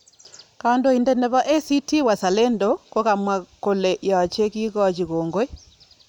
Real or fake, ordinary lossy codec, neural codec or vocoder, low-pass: real; none; none; 19.8 kHz